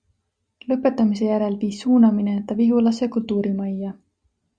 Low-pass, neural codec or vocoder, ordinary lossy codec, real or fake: 9.9 kHz; none; Opus, 64 kbps; real